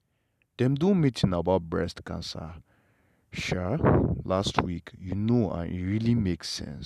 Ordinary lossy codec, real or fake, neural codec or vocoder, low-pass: none; real; none; 14.4 kHz